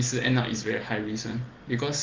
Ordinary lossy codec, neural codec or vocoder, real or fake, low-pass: Opus, 16 kbps; none; real; 7.2 kHz